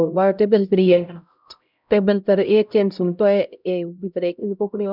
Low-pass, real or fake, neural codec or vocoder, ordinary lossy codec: 5.4 kHz; fake; codec, 16 kHz, 0.5 kbps, X-Codec, HuBERT features, trained on LibriSpeech; none